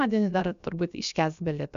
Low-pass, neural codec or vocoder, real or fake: 7.2 kHz; codec, 16 kHz, about 1 kbps, DyCAST, with the encoder's durations; fake